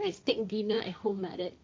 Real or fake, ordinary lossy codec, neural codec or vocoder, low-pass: fake; none; codec, 16 kHz, 1.1 kbps, Voila-Tokenizer; none